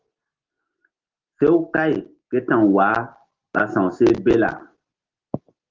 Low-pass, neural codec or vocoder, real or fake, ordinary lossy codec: 7.2 kHz; none; real; Opus, 16 kbps